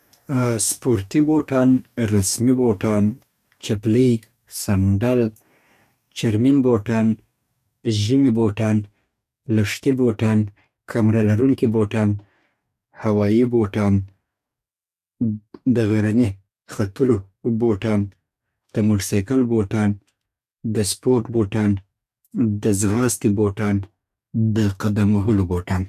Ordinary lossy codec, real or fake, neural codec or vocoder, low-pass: none; fake; codec, 44.1 kHz, 2.6 kbps, DAC; 14.4 kHz